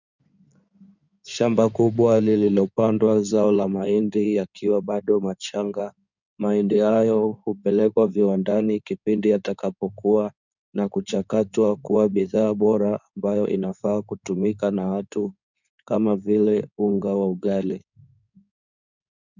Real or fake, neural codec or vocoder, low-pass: fake; codec, 16 kHz in and 24 kHz out, 2.2 kbps, FireRedTTS-2 codec; 7.2 kHz